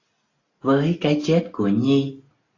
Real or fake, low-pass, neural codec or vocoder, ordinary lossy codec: real; 7.2 kHz; none; AAC, 32 kbps